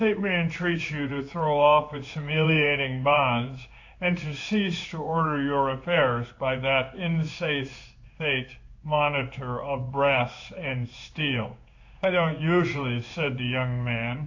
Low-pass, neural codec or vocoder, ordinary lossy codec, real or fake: 7.2 kHz; codec, 16 kHz in and 24 kHz out, 1 kbps, XY-Tokenizer; AAC, 48 kbps; fake